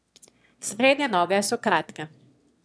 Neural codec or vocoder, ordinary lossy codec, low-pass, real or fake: autoencoder, 22.05 kHz, a latent of 192 numbers a frame, VITS, trained on one speaker; none; none; fake